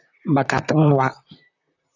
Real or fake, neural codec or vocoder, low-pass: fake; vocoder, 44.1 kHz, 128 mel bands, Pupu-Vocoder; 7.2 kHz